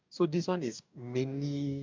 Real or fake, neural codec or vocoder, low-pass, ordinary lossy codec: fake; codec, 44.1 kHz, 2.6 kbps, DAC; 7.2 kHz; MP3, 64 kbps